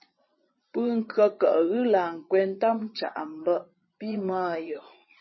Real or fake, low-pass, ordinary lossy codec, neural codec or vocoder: fake; 7.2 kHz; MP3, 24 kbps; vocoder, 22.05 kHz, 80 mel bands, Vocos